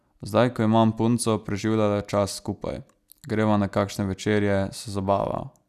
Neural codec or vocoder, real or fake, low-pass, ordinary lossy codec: none; real; 14.4 kHz; none